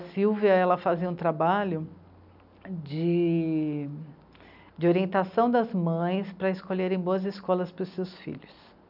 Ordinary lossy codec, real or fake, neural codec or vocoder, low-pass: none; real; none; 5.4 kHz